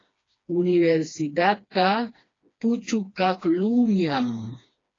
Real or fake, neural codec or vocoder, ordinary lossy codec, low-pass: fake; codec, 16 kHz, 2 kbps, FreqCodec, smaller model; AAC, 32 kbps; 7.2 kHz